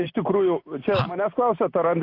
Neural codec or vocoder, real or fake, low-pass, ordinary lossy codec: none; real; 5.4 kHz; AAC, 32 kbps